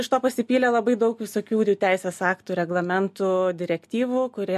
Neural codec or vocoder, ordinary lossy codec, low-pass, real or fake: none; MP3, 64 kbps; 14.4 kHz; real